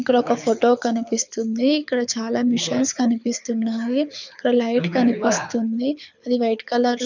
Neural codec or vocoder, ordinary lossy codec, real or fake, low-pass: codec, 24 kHz, 6 kbps, HILCodec; none; fake; 7.2 kHz